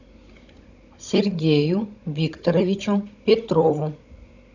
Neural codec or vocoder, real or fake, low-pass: codec, 16 kHz, 16 kbps, FunCodec, trained on Chinese and English, 50 frames a second; fake; 7.2 kHz